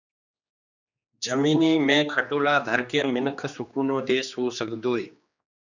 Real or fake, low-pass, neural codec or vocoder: fake; 7.2 kHz; codec, 16 kHz, 2 kbps, X-Codec, HuBERT features, trained on general audio